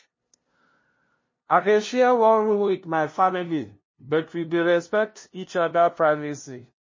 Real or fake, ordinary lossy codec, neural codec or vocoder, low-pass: fake; MP3, 32 kbps; codec, 16 kHz, 0.5 kbps, FunCodec, trained on LibriTTS, 25 frames a second; 7.2 kHz